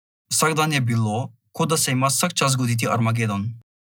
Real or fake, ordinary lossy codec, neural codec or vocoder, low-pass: real; none; none; none